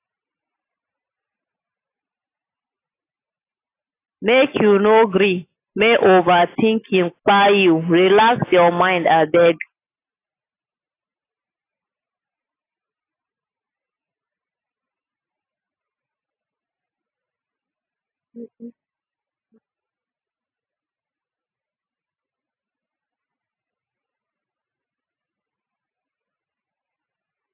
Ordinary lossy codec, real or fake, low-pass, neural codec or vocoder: AAC, 24 kbps; real; 3.6 kHz; none